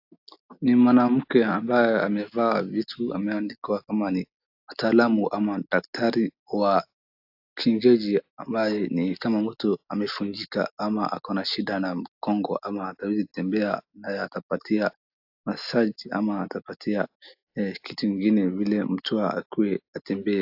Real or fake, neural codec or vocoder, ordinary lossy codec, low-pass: real; none; AAC, 48 kbps; 5.4 kHz